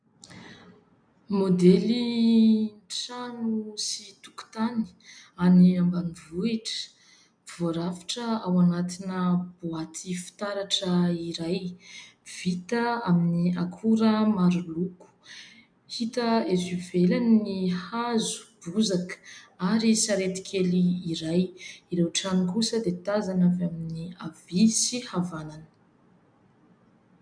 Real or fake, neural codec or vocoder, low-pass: real; none; 9.9 kHz